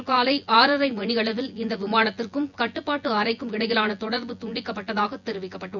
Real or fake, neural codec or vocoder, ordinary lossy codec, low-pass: fake; vocoder, 24 kHz, 100 mel bands, Vocos; none; 7.2 kHz